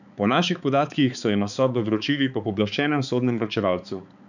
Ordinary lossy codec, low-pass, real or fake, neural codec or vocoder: none; 7.2 kHz; fake; codec, 16 kHz, 4 kbps, X-Codec, HuBERT features, trained on balanced general audio